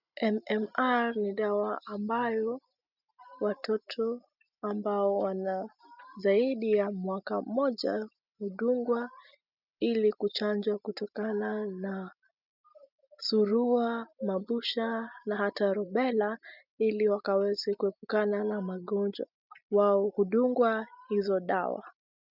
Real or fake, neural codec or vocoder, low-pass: real; none; 5.4 kHz